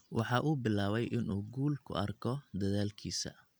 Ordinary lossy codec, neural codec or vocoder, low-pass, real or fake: none; none; none; real